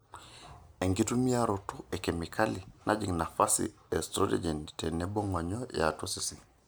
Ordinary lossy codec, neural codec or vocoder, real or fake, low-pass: none; none; real; none